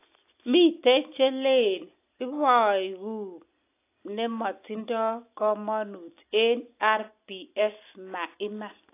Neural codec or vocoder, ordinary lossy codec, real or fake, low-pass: none; AAC, 24 kbps; real; 3.6 kHz